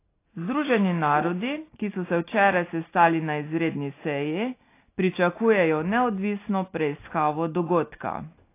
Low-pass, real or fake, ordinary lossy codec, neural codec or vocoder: 3.6 kHz; real; AAC, 24 kbps; none